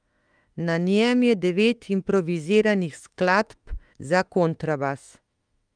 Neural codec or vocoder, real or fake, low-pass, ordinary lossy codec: autoencoder, 48 kHz, 32 numbers a frame, DAC-VAE, trained on Japanese speech; fake; 9.9 kHz; Opus, 32 kbps